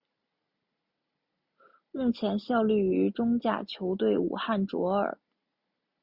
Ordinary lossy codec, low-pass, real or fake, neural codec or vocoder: Opus, 64 kbps; 5.4 kHz; real; none